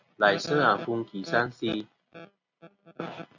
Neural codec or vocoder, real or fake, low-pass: none; real; 7.2 kHz